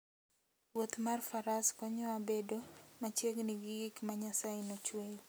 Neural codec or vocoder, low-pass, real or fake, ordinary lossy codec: none; none; real; none